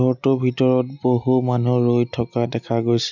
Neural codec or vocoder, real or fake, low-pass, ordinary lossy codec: none; real; 7.2 kHz; none